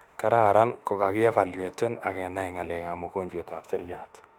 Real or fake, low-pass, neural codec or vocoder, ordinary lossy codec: fake; 19.8 kHz; autoencoder, 48 kHz, 32 numbers a frame, DAC-VAE, trained on Japanese speech; none